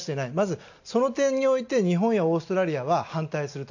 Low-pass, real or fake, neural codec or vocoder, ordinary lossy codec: 7.2 kHz; real; none; none